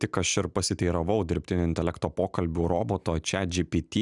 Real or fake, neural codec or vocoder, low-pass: real; none; 10.8 kHz